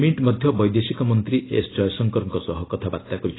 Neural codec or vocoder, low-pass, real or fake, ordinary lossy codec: none; 7.2 kHz; real; AAC, 16 kbps